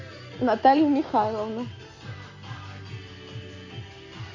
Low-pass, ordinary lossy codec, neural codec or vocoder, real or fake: 7.2 kHz; MP3, 48 kbps; none; real